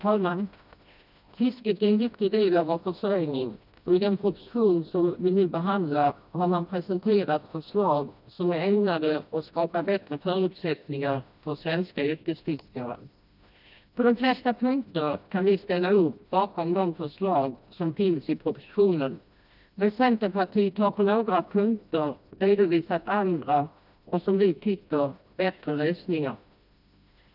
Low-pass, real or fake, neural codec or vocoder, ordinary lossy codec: 5.4 kHz; fake; codec, 16 kHz, 1 kbps, FreqCodec, smaller model; none